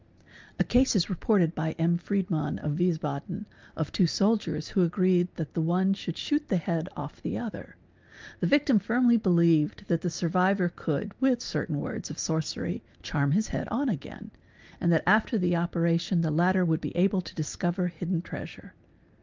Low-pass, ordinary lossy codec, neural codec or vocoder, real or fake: 7.2 kHz; Opus, 32 kbps; none; real